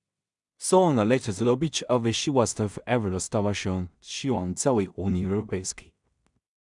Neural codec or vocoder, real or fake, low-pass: codec, 16 kHz in and 24 kHz out, 0.4 kbps, LongCat-Audio-Codec, two codebook decoder; fake; 10.8 kHz